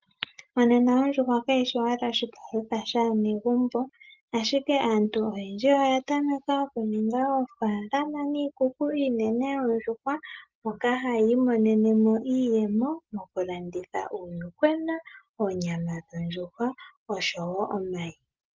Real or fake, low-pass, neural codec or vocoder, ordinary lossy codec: real; 7.2 kHz; none; Opus, 32 kbps